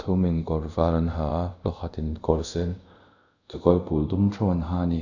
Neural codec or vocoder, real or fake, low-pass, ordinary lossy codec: codec, 24 kHz, 0.5 kbps, DualCodec; fake; 7.2 kHz; none